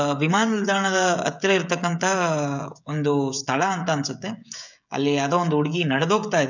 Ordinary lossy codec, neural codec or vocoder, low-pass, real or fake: none; codec, 16 kHz, 16 kbps, FreqCodec, smaller model; 7.2 kHz; fake